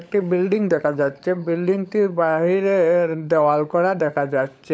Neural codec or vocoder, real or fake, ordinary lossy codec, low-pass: codec, 16 kHz, 16 kbps, FunCodec, trained on LibriTTS, 50 frames a second; fake; none; none